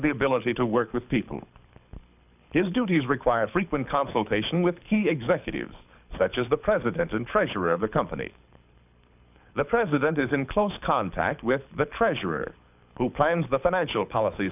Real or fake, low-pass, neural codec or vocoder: fake; 3.6 kHz; codec, 24 kHz, 6 kbps, HILCodec